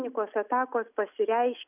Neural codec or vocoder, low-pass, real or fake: none; 3.6 kHz; real